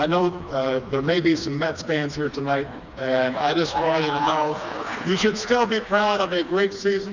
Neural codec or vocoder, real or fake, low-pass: codec, 16 kHz, 2 kbps, FreqCodec, smaller model; fake; 7.2 kHz